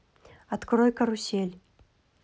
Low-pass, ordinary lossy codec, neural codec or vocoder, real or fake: none; none; none; real